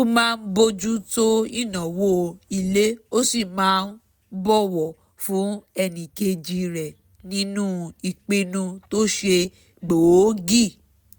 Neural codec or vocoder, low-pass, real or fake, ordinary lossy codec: none; none; real; none